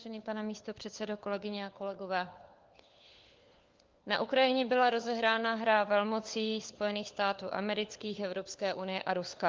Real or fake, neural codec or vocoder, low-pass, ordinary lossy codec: fake; codec, 16 kHz, 4 kbps, FunCodec, trained on LibriTTS, 50 frames a second; 7.2 kHz; Opus, 32 kbps